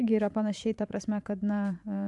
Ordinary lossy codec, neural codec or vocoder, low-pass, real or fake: AAC, 64 kbps; vocoder, 44.1 kHz, 128 mel bands every 512 samples, BigVGAN v2; 10.8 kHz; fake